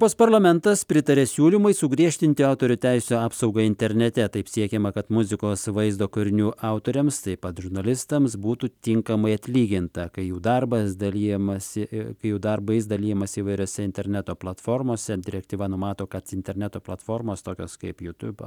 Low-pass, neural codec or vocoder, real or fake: 19.8 kHz; none; real